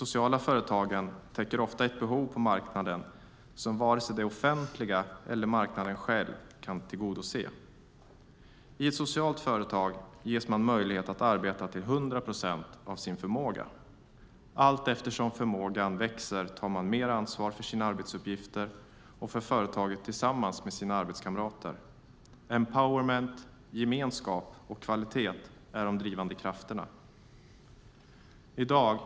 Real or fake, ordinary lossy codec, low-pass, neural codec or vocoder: real; none; none; none